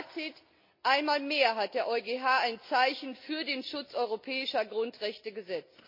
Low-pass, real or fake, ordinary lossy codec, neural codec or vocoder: 5.4 kHz; real; MP3, 32 kbps; none